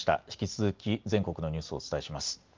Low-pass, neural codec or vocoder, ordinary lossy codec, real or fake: 7.2 kHz; none; Opus, 32 kbps; real